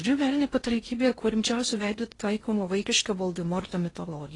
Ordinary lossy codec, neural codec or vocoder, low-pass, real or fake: AAC, 32 kbps; codec, 16 kHz in and 24 kHz out, 0.6 kbps, FocalCodec, streaming, 2048 codes; 10.8 kHz; fake